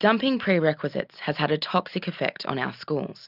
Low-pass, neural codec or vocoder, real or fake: 5.4 kHz; none; real